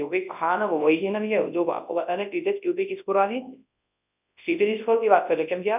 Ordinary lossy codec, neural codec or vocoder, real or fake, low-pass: none; codec, 24 kHz, 0.9 kbps, WavTokenizer, large speech release; fake; 3.6 kHz